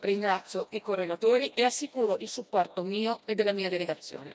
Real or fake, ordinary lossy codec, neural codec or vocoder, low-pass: fake; none; codec, 16 kHz, 1 kbps, FreqCodec, smaller model; none